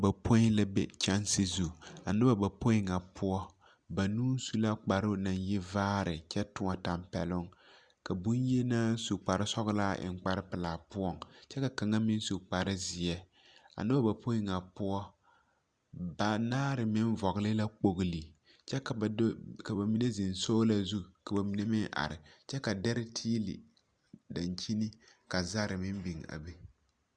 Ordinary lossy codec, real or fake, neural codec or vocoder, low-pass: Opus, 64 kbps; real; none; 9.9 kHz